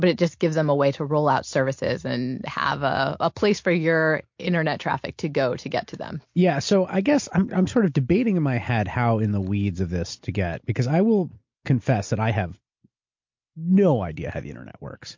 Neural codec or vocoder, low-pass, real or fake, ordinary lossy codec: none; 7.2 kHz; real; MP3, 48 kbps